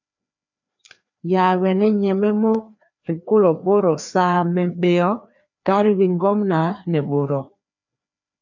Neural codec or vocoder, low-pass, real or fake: codec, 16 kHz, 2 kbps, FreqCodec, larger model; 7.2 kHz; fake